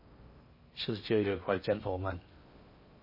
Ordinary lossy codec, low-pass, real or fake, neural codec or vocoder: MP3, 24 kbps; 5.4 kHz; fake; codec, 16 kHz in and 24 kHz out, 0.6 kbps, FocalCodec, streaming, 4096 codes